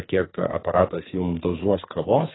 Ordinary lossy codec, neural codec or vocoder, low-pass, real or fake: AAC, 16 kbps; codec, 44.1 kHz, 2.6 kbps, SNAC; 7.2 kHz; fake